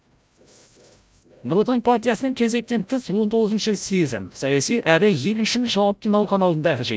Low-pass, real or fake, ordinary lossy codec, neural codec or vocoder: none; fake; none; codec, 16 kHz, 0.5 kbps, FreqCodec, larger model